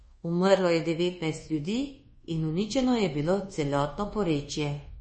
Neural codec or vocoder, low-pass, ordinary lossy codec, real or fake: codec, 24 kHz, 1.2 kbps, DualCodec; 10.8 kHz; MP3, 32 kbps; fake